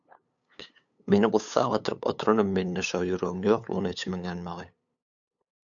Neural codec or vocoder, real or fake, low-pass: codec, 16 kHz, 8 kbps, FunCodec, trained on LibriTTS, 25 frames a second; fake; 7.2 kHz